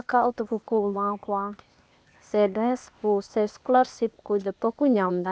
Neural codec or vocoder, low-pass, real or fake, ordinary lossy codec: codec, 16 kHz, 0.8 kbps, ZipCodec; none; fake; none